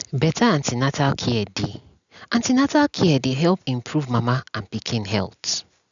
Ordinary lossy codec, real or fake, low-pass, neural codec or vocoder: none; real; 7.2 kHz; none